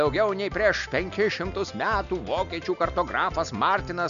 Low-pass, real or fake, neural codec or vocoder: 7.2 kHz; real; none